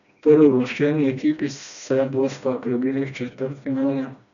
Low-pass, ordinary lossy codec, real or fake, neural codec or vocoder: 7.2 kHz; none; fake; codec, 16 kHz, 1 kbps, FreqCodec, smaller model